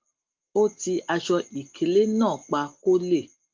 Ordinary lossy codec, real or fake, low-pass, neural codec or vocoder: Opus, 32 kbps; real; 7.2 kHz; none